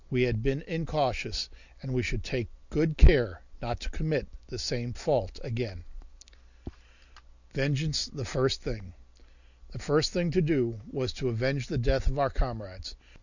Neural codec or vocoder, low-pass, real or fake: none; 7.2 kHz; real